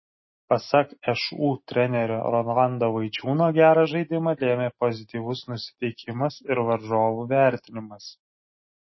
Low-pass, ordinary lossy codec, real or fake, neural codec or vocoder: 7.2 kHz; MP3, 24 kbps; real; none